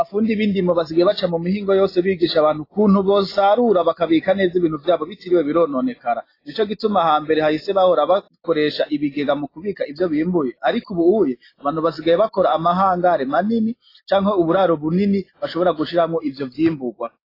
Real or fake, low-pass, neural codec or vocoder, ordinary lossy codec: real; 5.4 kHz; none; AAC, 24 kbps